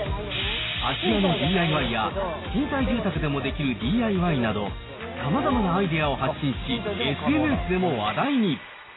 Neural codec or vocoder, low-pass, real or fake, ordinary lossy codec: none; 7.2 kHz; real; AAC, 16 kbps